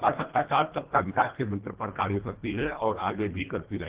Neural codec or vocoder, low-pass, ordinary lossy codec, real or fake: codec, 24 kHz, 1.5 kbps, HILCodec; 3.6 kHz; Opus, 16 kbps; fake